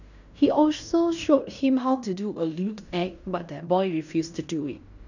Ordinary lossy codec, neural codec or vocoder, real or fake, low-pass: none; codec, 16 kHz in and 24 kHz out, 0.9 kbps, LongCat-Audio-Codec, fine tuned four codebook decoder; fake; 7.2 kHz